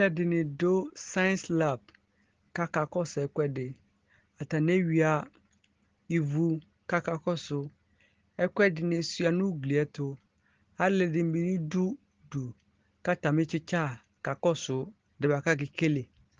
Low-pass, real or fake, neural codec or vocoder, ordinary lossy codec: 7.2 kHz; real; none; Opus, 16 kbps